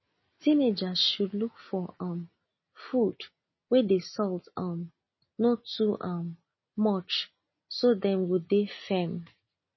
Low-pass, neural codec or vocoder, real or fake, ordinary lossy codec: 7.2 kHz; none; real; MP3, 24 kbps